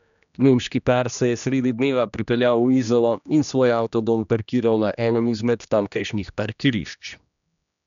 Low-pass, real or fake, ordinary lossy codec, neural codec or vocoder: 7.2 kHz; fake; none; codec, 16 kHz, 1 kbps, X-Codec, HuBERT features, trained on general audio